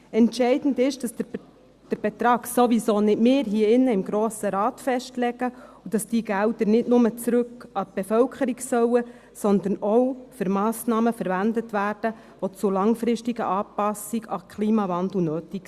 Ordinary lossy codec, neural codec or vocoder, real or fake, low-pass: Opus, 64 kbps; none; real; 14.4 kHz